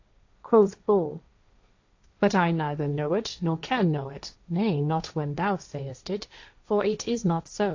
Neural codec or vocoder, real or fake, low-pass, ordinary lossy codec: codec, 16 kHz, 1.1 kbps, Voila-Tokenizer; fake; 7.2 kHz; AAC, 48 kbps